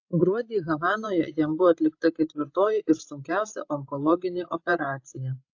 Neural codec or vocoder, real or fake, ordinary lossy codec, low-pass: codec, 16 kHz, 16 kbps, FreqCodec, larger model; fake; AAC, 48 kbps; 7.2 kHz